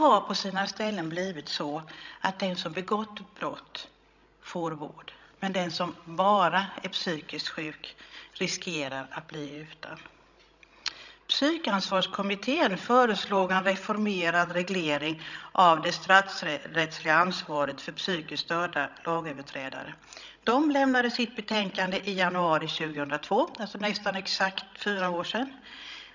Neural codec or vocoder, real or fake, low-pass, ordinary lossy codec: codec, 16 kHz, 16 kbps, FreqCodec, larger model; fake; 7.2 kHz; none